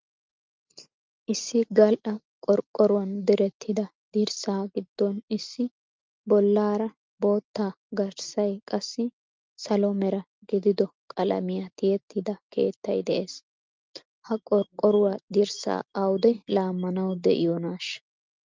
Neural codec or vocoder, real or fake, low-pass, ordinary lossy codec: none; real; 7.2 kHz; Opus, 24 kbps